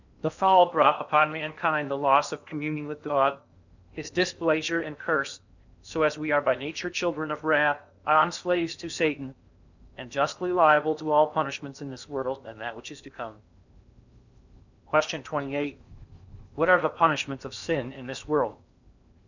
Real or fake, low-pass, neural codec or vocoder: fake; 7.2 kHz; codec, 16 kHz in and 24 kHz out, 0.8 kbps, FocalCodec, streaming, 65536 codes